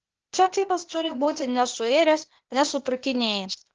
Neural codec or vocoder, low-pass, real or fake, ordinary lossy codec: codec, 16 kHz, 0.8 kbps, ZipCodec; 7.2 kHz; fake; Opus, 16 kbps